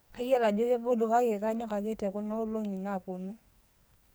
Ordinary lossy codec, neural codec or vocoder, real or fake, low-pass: none; codec, 44.1 kHz, 2.6 kbps, SNAC; fake; none